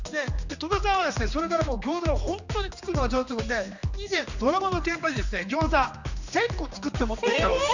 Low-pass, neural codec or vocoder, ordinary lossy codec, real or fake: 7.2 kHz; codec, 16 kHz, 2 kbps, X-Codec, HuBERT features, trained on general audio; none; fake